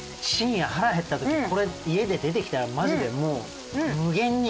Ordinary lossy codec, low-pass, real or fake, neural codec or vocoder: none; none; real; none